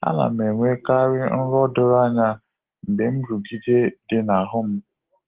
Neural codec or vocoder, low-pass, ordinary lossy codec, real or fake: none; 3.6 kHz; Opus, 16 kbps; real